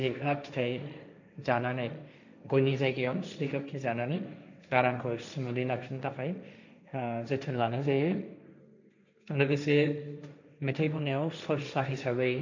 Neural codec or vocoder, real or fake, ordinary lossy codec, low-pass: codec, 16 kHz, 1.1 kbps, Voila-Tokenizer; fake; none; 7.2 kHz